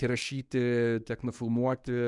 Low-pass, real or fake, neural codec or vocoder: 10.8 kHz; fake; codec, 24 kHz, 0.9 kbps, WavTokenizer, medium speech release version 1